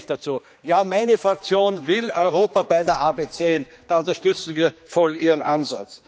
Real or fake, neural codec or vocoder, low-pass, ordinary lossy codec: fake; codec, 16 kHz, 2 kbps, X-Codec, HuBERT features, trained on general audio; none; none